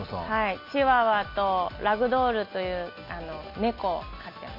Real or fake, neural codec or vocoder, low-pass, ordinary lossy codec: real; none; 5.4 kHz; MP3, 32 kbps